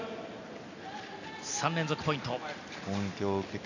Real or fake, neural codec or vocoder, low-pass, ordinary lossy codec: real; none; 7.2 kHz; none